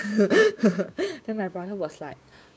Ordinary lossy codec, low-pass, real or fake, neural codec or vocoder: none; none; fake; codec, 16 kHz, 6 kbps, DAC